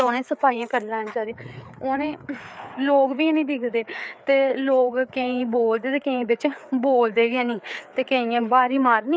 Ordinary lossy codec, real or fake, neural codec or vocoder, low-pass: none; fake; codec, 16 kHz, 4 kbps, FreqCodec, larger model; none